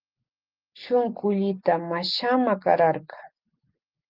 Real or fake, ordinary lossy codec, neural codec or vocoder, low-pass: real; Opus, 24 kbps; none; 5.4 kHz